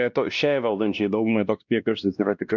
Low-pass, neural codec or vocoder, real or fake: 7.2 kHz; codec, 16 kHz, 1 kbps, X-Codec, WavLM features, trained on Multilingual LibriSpeech; fake